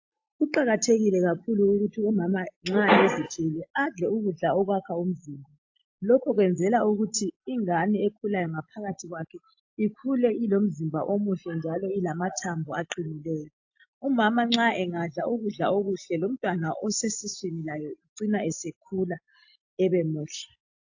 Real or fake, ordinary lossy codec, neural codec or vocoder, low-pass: real; AAC, 48 kbps; none; 7.2 kHz